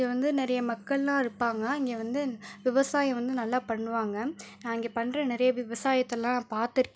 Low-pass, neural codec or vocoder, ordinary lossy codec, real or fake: none; none; none; real